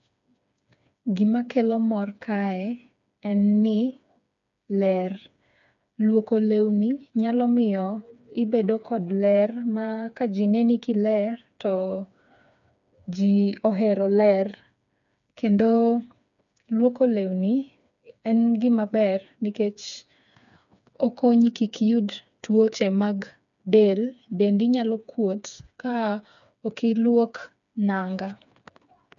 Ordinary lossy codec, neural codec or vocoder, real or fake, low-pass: none; codec, 16 kHz, 4 kbps, FreqCodec, smaller model; fake; 7.2 kHz